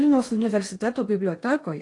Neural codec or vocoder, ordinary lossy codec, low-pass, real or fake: codec, 16 kHz in and 24 kHz out, 0.6 kbps, FocalCodec, streaming, 4096 codes; AAC, 48 kbps; 10.8 kHz; fake